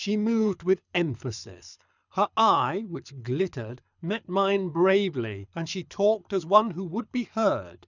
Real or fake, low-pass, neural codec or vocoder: fake; 7.2 kHz; codec, 24 kHz, 6 kbps, HILCodec